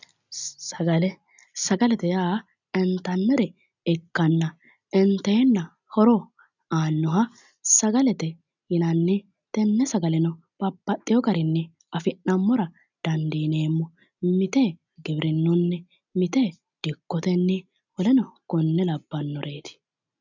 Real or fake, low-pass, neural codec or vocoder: real; 7.2 kHz; none